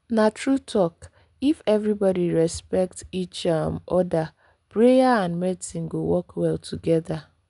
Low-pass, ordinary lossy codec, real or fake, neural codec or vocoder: 10.8 kHz; none; real; none